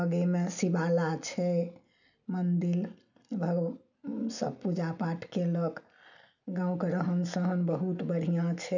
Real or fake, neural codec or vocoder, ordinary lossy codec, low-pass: real; none; none; 7.2 kHz